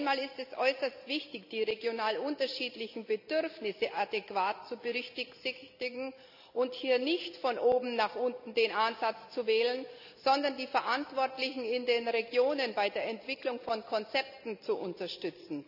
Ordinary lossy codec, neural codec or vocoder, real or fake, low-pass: none; none; real; 5.4 kHz